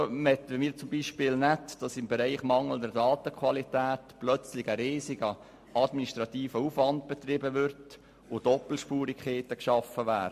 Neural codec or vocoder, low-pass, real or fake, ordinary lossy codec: none; 14.4 kHz; real; MP3, 64 kbps